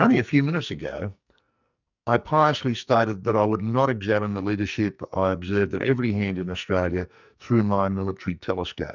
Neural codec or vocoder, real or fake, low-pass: codec, 44.1 kHz, 2.6 kbps, SNAC; fake; 7.2 kHz